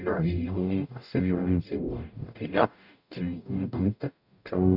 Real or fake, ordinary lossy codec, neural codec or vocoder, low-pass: fake; none; codec, 44.1 kHz, 0.9 kbps, DAC; 5.4 kHz